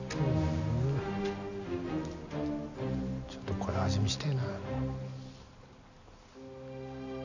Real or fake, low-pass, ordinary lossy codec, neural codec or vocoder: real; 7.2 kHz; none; none